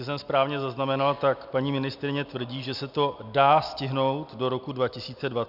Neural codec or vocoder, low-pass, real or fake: none; 5.4 kHz; real